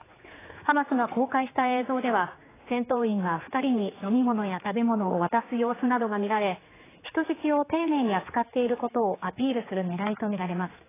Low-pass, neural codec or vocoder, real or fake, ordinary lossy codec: 3.6 kHz; codec, 16 kHz, 4 kbps, X-Codec, HuBERT features, trained on general audio; fake; AAC, 16 kbps